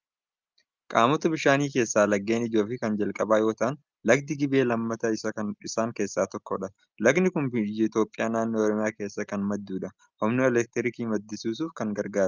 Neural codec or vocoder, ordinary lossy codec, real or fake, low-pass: none; Opus, 24 kbps; real; 7.2 kHz